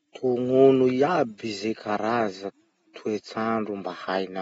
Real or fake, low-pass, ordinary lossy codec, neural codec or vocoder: real; 19.8 kHz; AAC, 24 kbps; none